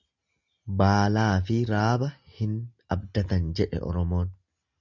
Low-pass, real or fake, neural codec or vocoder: 7.2 kHz; real; none